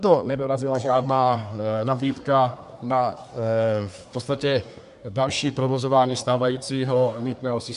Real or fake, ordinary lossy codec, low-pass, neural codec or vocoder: fake; AAC, 96 kbps; 10.8 kHz; codec, 24 kHz, 1 kbps, SNAC